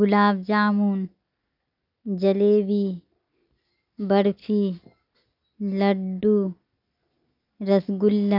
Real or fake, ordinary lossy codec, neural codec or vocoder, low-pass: real; none; none; 5.4 kHz